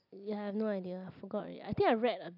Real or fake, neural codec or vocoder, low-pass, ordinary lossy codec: real; none; 5.4 kHz; none